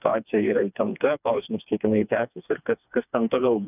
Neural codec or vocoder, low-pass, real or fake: codec, 16 kHz, 2 kbps, FreqCodec, smaller model; 3.6 kHz; fake